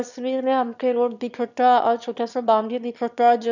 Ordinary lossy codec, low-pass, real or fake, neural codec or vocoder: none; 7.2 kHz; fake; autoencoder, 22.05 kHz, a latent of 192 numbers a frame, VITS, trained on one speaker